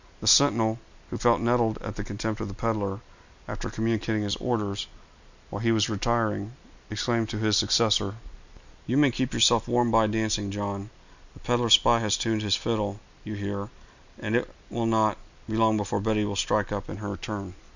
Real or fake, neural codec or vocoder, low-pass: real; none; 7.2 kHz